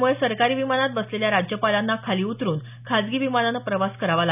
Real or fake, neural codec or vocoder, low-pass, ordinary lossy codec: real; none; 3.6 kHz; none